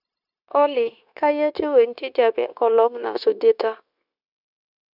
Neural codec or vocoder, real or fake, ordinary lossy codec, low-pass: codec, 16 kHz, 0.9 kbps, LongCat-Audio-Codec; fake; none; 5.4 kHz